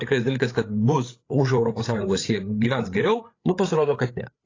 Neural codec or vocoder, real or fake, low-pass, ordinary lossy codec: codec, 16 kHz, 8 kbps, FreqCodec, larger model; fake; 7.2 kHz; AAC, 32 kbps